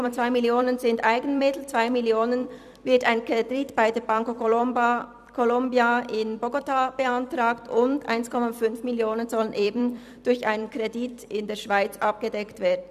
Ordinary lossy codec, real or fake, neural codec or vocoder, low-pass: none; fake; vocoder, 44.1 kHz, 128 mel bands every 256 samples, BigVGAN v2; 14.4 kHz